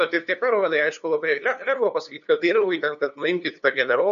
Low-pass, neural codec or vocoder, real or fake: 7.2 kHz; codec, 16 kHz, 2 kbps, FunCodec, trained on LibriTTS, 25 frames a second; fake